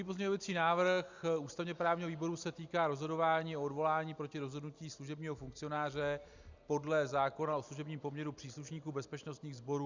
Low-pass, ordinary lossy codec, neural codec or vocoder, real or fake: 7.2 kHz; Opus, 64 kbps; none; real